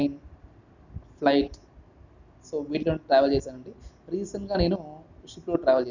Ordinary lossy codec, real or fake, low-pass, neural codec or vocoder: none; real; 7.2 kHz; none